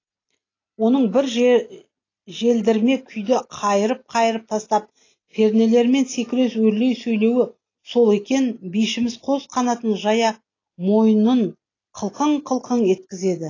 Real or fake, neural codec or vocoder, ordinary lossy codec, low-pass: real; none; AAC, 32 kbps; 7.2 kHz